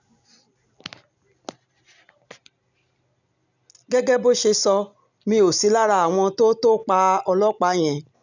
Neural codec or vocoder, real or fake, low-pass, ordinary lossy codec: none; real; 7.2 kHz; none